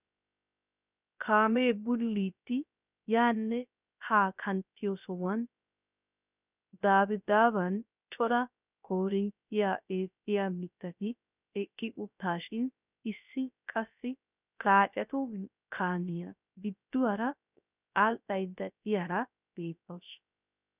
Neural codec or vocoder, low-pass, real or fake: codec, 16 kHz, 0.3 kbps, FocalCodec; 3.6 kHz; fake